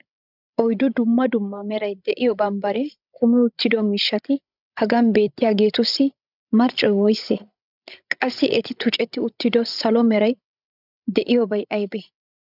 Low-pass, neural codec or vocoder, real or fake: 5.4 kHz; codec, 16 kHz, 16 kbps, FreqCodec, larger model; fake